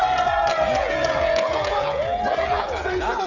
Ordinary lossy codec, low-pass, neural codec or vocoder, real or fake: none; 7.2 kHz; codec, 16 kHz, 16 kbps, FreqCodec, smaller model; fake